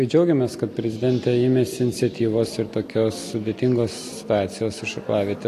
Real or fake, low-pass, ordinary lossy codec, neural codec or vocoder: real; 14.4 kHz; AAC, 48 kbps; none